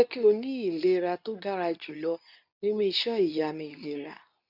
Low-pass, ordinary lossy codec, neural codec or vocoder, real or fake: 5.4 kHz; none; codec, 24 kHz, 0.9 kbps, WavTokenizer, medium speech release version 2; fake